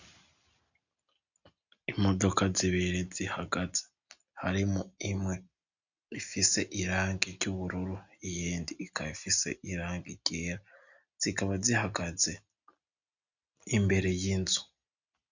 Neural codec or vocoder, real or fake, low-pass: none; real; 7.2 kHz